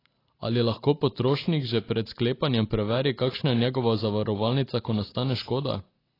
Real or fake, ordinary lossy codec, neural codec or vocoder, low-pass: real; AAC, 24 kbps; none; 5.4 kHz